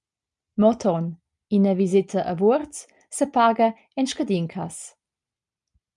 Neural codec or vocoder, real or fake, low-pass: none; real; 10.8 kHz